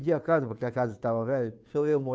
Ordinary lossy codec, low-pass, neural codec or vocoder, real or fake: none; none; codec, 16 kHz, 2 kbps, FunCodec, trained on Chinese and English, 25 frames a second; fake